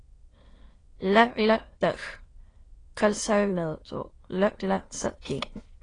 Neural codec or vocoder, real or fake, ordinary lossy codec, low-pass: autoencoder, 22.05 kHz, a latent of 192 numbers a frame, VITS, trained on many speakers; fake; AAC, 32 kbps; 9.9 kHz